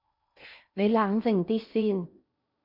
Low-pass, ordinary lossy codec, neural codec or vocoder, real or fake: 5.4 kHz; MP3, 48 kbps; codec, 16 kHz in and 24 kHz out, 0.8 kbps, FocalCodec, streaming, 65536 codes; fake